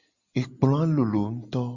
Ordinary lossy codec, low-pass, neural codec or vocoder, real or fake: Opus, 64 kbps; 7.2 kHz; none; real